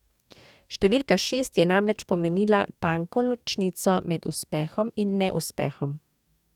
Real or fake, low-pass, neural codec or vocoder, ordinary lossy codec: fake; 19.8 kHz; codec, 44.1 kHz, 2.6 kbps, DAC; none